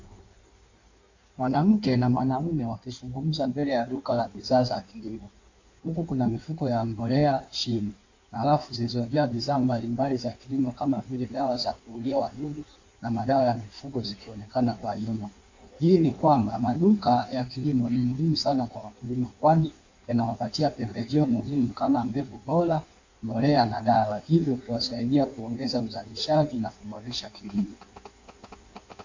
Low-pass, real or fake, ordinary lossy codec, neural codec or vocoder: 7.2 kHz; fake; AAC, 48 kbps; codec, 16 kHz in and 24 kHz out, 1.1 kbps, FireRedTTS-2 codec